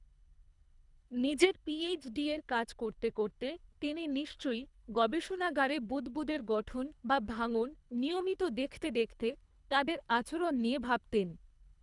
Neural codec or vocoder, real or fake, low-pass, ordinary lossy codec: codec, 24 kHz, 3 kbps, HILCodec; fake; 10.8 kHz; none